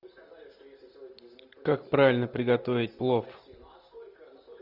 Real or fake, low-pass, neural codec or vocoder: real; 5.4 kHz; none